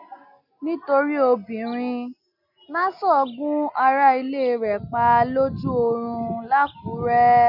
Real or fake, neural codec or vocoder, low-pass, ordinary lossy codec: real; none; 5.4 kHz; none